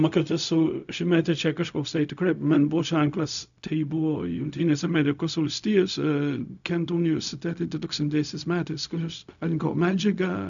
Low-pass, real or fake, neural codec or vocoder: 7.2 kHz; fake; codec, 16 kHz, 0.4 kbps, LongCat-Audio-Codec